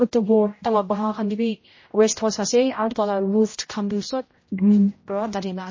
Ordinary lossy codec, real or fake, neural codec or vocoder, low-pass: MP3, 32 kbps; fake; codec, 16 kHz, 0.5 kbps, X-Codec, HuBERT features, trained on general audio; 7.2 kHz